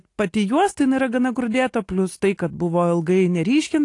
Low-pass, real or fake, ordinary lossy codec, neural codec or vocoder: 10.8 kHz; real; AAC, 48 kbps; none